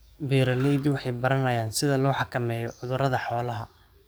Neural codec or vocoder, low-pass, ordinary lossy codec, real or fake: codec, 44.1 kHz, 7.8 kbps, DAC; none; none; fake